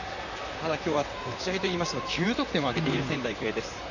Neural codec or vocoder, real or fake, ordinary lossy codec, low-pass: vocoder, 44.1 kHz, 128 mel bands, Pupu-Vocoder; fake; none; 7.2 kHz